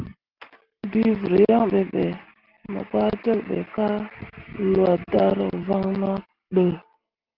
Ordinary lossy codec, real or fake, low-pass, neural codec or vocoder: Opus, 16 kbps; real; 5.4 kHz; none